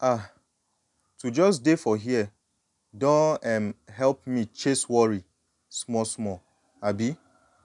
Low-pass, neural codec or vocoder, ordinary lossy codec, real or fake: 10.8 kHz; none; none; real